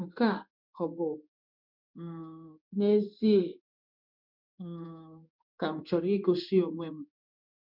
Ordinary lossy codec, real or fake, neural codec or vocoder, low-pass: none; fake; codec, 16 kHz in and 24 kHz out, 1 kbps, XY-Tokenizer; 5.4 kHz